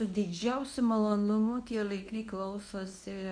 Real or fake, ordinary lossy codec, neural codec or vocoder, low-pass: fake; MP3, 64 kbps; codec, 24 kHz, 0.9 kbps, WavTokenizer, medium speech release version 1; 9.9 kHz